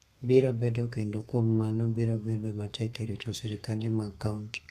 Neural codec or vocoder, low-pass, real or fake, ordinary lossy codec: codec, 32 kHz, 1.9 kbps, SNAC; 14.4 kHz; fake; none